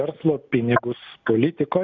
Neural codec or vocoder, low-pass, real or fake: none; 7.2 kHz; real